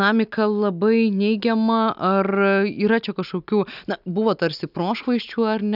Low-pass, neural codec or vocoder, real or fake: 5.4 kHz; none; real